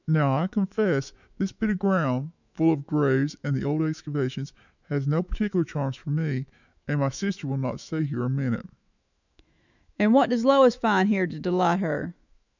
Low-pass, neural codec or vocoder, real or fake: 7.2 kHz; autoencoder, 48 kHz, 128 numbers a frame, DAC-VAE, trained on Japanese speech; fake